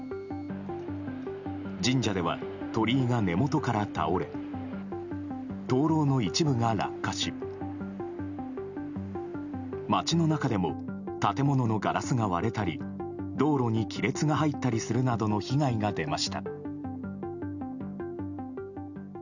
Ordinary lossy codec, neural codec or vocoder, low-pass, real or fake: none; none; 7.2 kHz; real